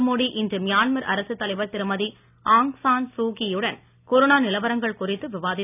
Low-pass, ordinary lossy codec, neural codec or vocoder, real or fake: 3.6 kHz; none; none; real